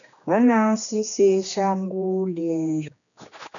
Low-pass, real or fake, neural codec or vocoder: 7.2 kHz; fake; codec, 16 kHz, 2 kbps, X-Codec, HuBERT features, trained on general audio